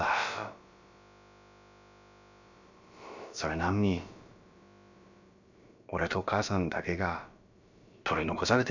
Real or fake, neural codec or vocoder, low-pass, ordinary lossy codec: fake; codec, 16 kHz, about 1 kbps, DyCAST, with the encoder's durations; 7.2 kHz; none